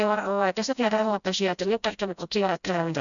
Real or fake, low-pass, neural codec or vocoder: fake; 7.2 kHz; codec, 16 kHz, 0.5 kbps, FreqCodec, smaller model